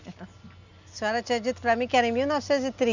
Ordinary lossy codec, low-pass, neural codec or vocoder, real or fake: none; 7.2 kHz; none; real